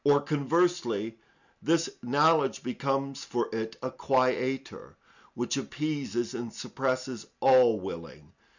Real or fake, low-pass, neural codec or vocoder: real; 7.2 kHz; none